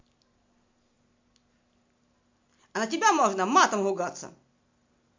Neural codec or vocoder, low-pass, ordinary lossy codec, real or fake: none; 7.2 kHz; MP3, 48 kbps; real